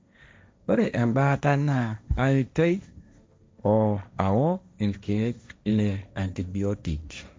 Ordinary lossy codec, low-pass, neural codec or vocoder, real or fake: none; none; codec, 16 kHz, 1.1 kbps, Voila-Tokenizer; fake